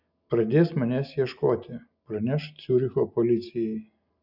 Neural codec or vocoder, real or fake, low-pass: none; real; 5.4 kHz